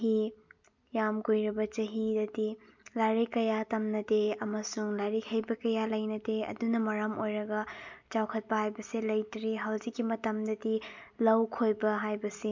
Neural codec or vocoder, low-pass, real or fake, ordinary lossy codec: none; 7.2 kHz; real; MP3, 64 kbps